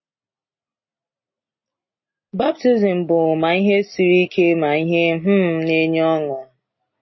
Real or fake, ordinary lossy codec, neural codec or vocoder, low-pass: real; MP3, 24 kbps; none; 7.2 kHz